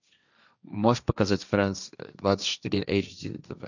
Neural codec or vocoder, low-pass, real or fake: codec, 16 kHz, 1.1 kbps, Voila-Tokenizer; 7.2 kHz; fake